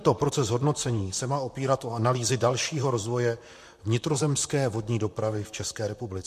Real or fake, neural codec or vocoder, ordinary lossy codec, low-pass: fake; vocoder, 44.1 kHz, 128 mel bands, Pupu-Vocoder; MP3, 64 kbps; 14.4 kHz